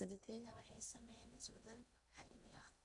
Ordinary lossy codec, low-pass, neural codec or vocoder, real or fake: none; 10.8 kHz; codec, 16 kHz in and 24 kHz out, 0.8 kbps, FocalCodec, streaming, 65536 codes; fake